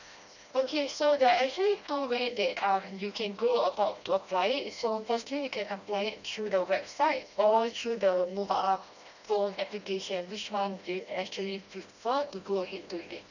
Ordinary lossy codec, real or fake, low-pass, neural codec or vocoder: none; fake; 7.2 kHz; codec, 16 kHz, 1 kbps, FreqCodec, smaller model